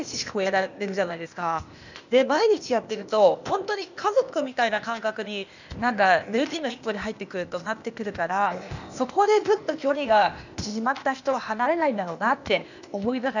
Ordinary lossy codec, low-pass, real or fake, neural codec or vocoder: none; 7.2 kHz; fake; codec, 16 kHz, 0.8 kbps, ZipCodec